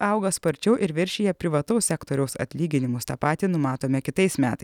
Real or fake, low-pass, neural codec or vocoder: real; 19.8 kHz; none